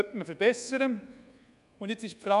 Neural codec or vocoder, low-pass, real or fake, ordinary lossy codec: codec, 24 kHz, 1.2 kbps, DualCodec; 10.8 kHz; fake; none